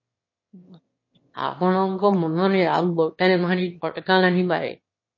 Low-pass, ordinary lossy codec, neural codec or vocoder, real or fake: 7.2 kHz; MP3, 32 kbps; autoencoder, 22.05 kHz, a latent of 192 numbers a frame, VITS, trained on one speaker; fake